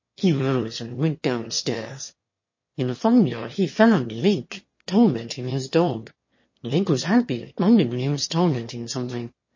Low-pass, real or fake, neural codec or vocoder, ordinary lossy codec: 7.2 kHz; fake; autoencoder, 22.05 kHz, a latent of 192 numbers a frame, VITS, trained on one speaker; MP3, 32 kbps